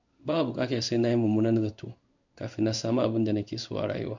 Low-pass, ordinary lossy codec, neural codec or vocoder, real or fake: 7.2 kHz; none; codec, 16 kHz in and 24 kHz out, 1 kbps, XY-Tokenizer; fake